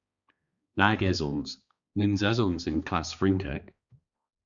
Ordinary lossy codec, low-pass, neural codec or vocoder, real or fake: Opus, 64 kbps; 7.2 kHz; codec, 16 kHz, 2 kbps, X-Codec, HuBERT features, trained on balanced general audio; fake